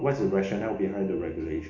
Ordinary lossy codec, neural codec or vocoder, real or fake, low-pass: AAC, 48 kbps; none; real; 7.2 kHz